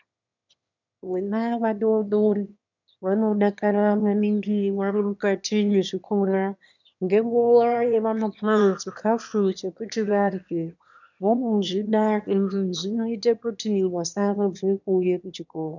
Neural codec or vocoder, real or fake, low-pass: autoencoder, 22.05 kHz, a latent of 192 numbers a frame, VITS, trained on one speaker; fake; 7.2 kHz